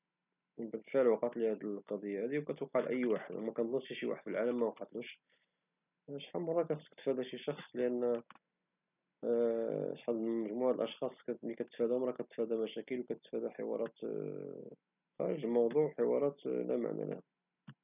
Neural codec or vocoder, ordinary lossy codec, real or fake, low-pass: none; none; real; 3.6 kHz